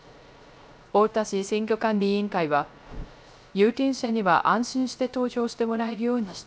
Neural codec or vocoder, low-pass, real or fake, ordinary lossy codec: codec, 16 kHz, 0.3 kbps, FocalCodec; none; fake; none